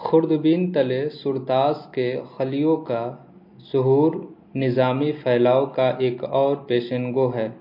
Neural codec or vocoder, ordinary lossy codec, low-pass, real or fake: none; MP3, 32 kbps; 5.4 kHz; real